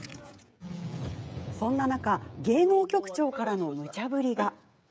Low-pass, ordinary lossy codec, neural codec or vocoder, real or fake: none; none; codec, 16 kHz, 16 kbps, FreqCodec, smaller model; fake